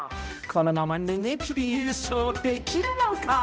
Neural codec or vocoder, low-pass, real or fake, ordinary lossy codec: codec, 16 kHz, 0.5 kbps, X-Codec, HuBERT features, trained on balanced general audio; none; fake; none